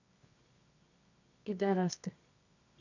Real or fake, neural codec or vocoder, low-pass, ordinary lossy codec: fake; codec, 24 kHz, 0.9 kbps, WavTokenizer, medium music audio release; 7.2 kHz; none